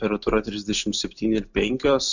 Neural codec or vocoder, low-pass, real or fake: none; 7.2 kHz; real